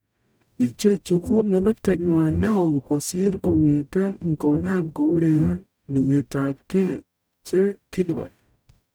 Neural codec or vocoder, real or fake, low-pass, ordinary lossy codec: codec, 44.1 kHz, 0.9 kbps, DAC; fake; none; none